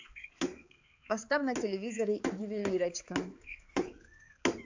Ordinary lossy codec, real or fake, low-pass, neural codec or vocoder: AAC, 48 kbps; fake; 7.2 kHz; codec, 16 kHz, 4 kbps, X-Codec, HuBERT features, trained on balanced general audio